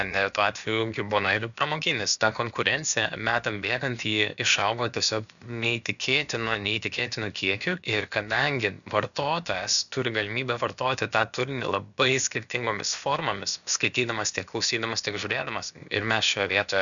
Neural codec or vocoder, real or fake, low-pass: codec, 16 kHz, about 1 kbps, DyCAST, with the encoder's durations; fake; 7.2 kHz